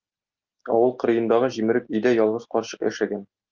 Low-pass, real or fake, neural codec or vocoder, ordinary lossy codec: 7.2 kHz; real; none; Opus, 24 kbps